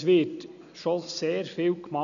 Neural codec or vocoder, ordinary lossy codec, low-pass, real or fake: none; none; 7.2 kHz; real